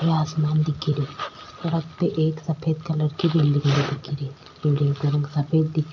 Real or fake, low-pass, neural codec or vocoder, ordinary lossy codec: real; 7.2 kHz; none; none